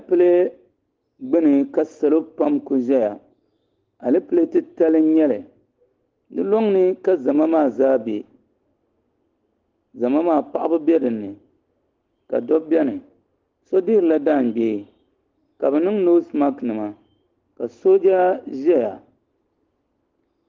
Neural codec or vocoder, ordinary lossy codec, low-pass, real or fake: none; Opus, 16 kbps; 7.2 kHz; real